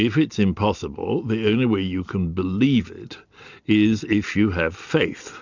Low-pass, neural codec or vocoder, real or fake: 7.2 kHz; none; real